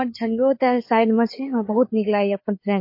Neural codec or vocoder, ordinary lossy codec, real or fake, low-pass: codec, 16 kHz, 4 kbps, FreqCodec, larger model; MP3, 32 kbps; fake; 5.4 kHz